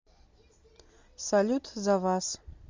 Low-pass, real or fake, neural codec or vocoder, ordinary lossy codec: 7.2 kHz; real; none; MP3, 64 kbps